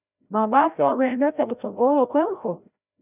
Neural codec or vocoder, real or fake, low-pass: codec, 16 kHz, 0.5 kbps, FreqCodec, larger model; fake; 3.6 kHz